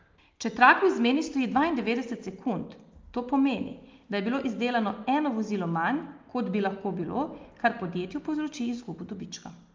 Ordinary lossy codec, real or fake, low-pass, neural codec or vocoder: Opus, 32 kbps; real; 7.2 kHz; none